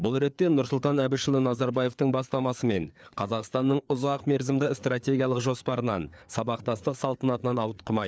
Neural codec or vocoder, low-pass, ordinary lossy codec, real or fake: codec, 16 kHz, 4 kbps, FreqCodec, larger model; none; none; fake